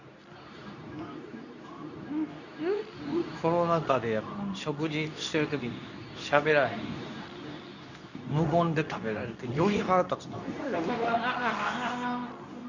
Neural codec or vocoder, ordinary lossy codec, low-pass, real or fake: codec, 24 kHz, 0.9 kbps, WavTokenizer, medium speech release version 2; none; 7.2 kHz; fake